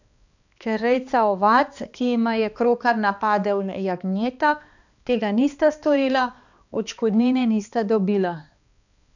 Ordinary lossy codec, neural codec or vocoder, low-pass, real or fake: none; codec, 16 kHz, 2 kbps, X-Codec, HuBERT features, trained on balanced general audio; 7.2 kHz; fake